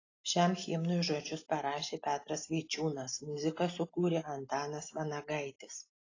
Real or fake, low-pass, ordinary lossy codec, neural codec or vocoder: real; 7.2 kHz; AAC, 32 kbps; none